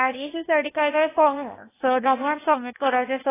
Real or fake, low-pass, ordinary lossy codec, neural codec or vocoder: fake; 3.6 kHz; AAC, 16 kbps; codec, 16 kHz, 0.8 kbps, ZipCodec